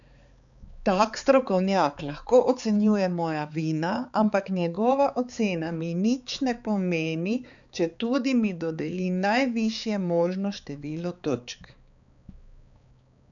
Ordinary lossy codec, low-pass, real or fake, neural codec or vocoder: none; 7.2 kHz; fake; codec, 16 kHz, 4 kbps, X-Codec, HuBERT features, trained on balanced general audio